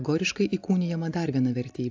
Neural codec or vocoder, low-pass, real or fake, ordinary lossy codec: none; 7.2 kHz; real; AAC, 48 kbps